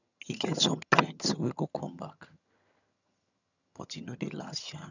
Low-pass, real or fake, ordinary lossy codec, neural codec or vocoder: 7.2 kHz; fake; none; vocoder, 22.05 kHz, 80 mel bands, HiFi-GAN